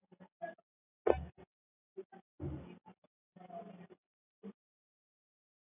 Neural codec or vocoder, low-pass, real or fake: none; 3.6 kHz; real